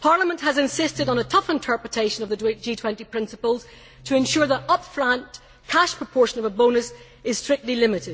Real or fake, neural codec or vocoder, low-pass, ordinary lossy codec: real; none; none; none